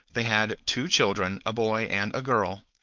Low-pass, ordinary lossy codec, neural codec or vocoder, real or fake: 7.2 kHz; Opus, 24 kbps; codec, 16 kHz, 4.8 kbps, FACodec; fake